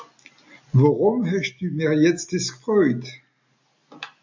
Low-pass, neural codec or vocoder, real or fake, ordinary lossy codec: 7.2 kHz; none; real; MP3, 64 kbps